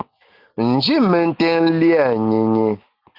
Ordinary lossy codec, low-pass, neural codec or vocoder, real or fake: Opus, 24 kbps; 5.4 kHz; none; real